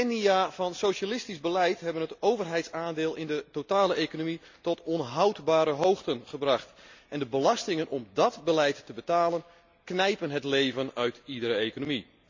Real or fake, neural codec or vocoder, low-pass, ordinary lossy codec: real; none; 7.2 kHz; MP3, 64 kbps